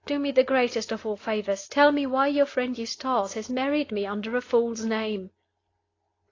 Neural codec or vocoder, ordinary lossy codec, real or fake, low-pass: none; AAC, 32 kbps; real; 7.2 kHz